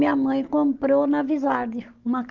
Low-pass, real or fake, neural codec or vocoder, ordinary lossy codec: 7.2 kHz; real; none; Opus, 32 kbps